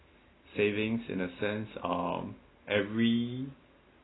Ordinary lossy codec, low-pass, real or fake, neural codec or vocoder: AAC, 16 kbps; 7.2 kHz; real; none